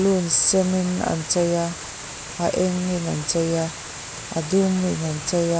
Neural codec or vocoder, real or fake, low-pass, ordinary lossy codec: none; real; none; none